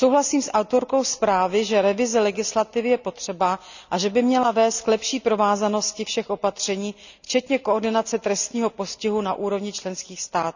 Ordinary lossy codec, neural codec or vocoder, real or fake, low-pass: none; none; real; 7.2 kHz